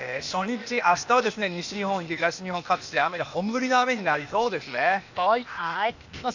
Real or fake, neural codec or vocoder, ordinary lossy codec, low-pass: fake; codec, 16 kHz, 0.8 kbps, ZipCodec; none; 7.2 kHz